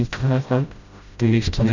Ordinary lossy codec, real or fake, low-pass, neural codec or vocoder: none; fake; 7.2 kHz; codec, 16 kHz, 0.5 kbps, FreqCodec, smaller model